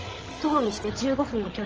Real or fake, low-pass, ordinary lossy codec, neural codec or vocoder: fake; 7.2 kHz; Opus, 16 kbps; codec, 16 kHz, 16 kbps, FreqCodec, larger model